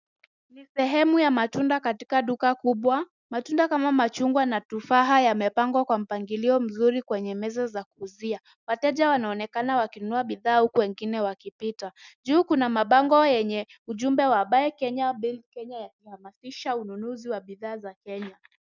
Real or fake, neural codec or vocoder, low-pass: real; none; 7.2 kHz